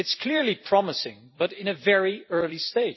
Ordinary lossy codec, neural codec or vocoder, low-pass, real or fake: MP3, 24 kbps; vocoder, 44.1 kHz, 128 mel bands every 256 samples, BigVGAN v2; 7.2 kHz; fake